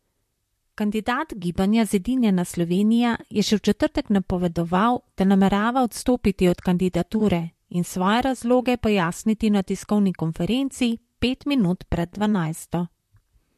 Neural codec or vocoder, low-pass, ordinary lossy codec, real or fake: vocoder, 44.1 kHz, 128 mel bands, Pupu-Vocoder; 14.4 kHz; MP3, 64 kbps; fake